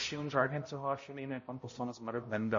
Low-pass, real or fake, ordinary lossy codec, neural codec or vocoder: 7.2 kHz; fake; MP3, 32 kbps; codec, 16 kHz, 0.5 kbps, X-Codec, HuBERT features, trained on balanced general audio